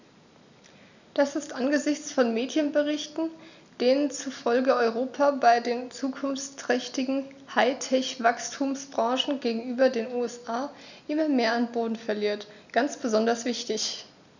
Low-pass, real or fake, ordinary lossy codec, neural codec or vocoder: 7.2 kHz; real; none; none